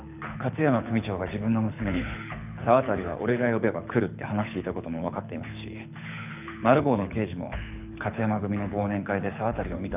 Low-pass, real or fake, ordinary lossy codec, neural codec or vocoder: 3.6 kHz; fake; none; codec, 24 kHz, 6 kbps, HILCodec